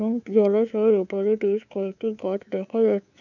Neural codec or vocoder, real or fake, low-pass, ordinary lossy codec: codec, 24 kHz, 3.1 kbps, DualCodec; fake; 7.2 kHz; none